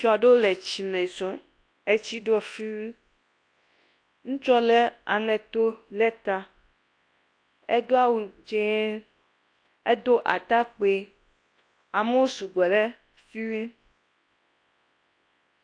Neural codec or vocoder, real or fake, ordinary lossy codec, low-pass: codec, 24 kHz, 0.9 kbps, WavTokenizer, large speech release; fake; AAC, 48 kbps; 9.9 kHz